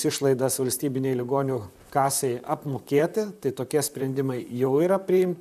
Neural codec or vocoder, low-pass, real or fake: vocoder, 44.1 kHz, 128 mel bands, Pupu-Vocoder; 14.4 kHz; fake